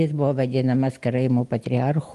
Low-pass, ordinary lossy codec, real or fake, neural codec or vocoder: 10.8 kHz; Opus, 24 kbps; real; none